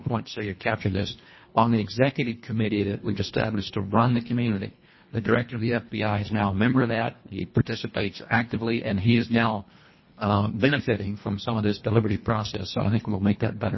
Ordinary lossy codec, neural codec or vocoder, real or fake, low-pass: MP3, 24 kbps; codec, 24 kHz, 1.5 kbps, HILCodec; fake; 7.2 kHz